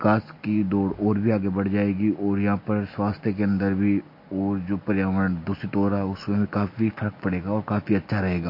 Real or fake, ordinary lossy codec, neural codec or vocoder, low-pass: real; MP3, 32 kbps; none; 5.4 kHz